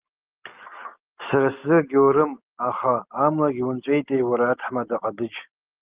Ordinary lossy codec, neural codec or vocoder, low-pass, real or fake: Opus, 32 kbps; none; 3.6 kHz; real